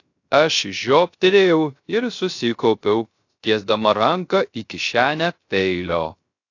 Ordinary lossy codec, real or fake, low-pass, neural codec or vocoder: AAC, 48 kbps; fake; 7.2 kHz; codec, 16 kHz, 0.3 kbps, FocalCodec